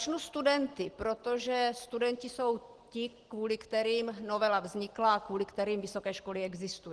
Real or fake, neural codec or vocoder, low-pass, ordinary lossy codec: real; none; 10.8 kHz; Opus, 16 kbps